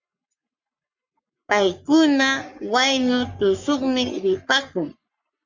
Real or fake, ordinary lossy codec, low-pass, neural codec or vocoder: fake; Opus, 64 kbps; 7.2 kHz; codec, 44.1 kHz, 3.4 kbps, Pupu-Codec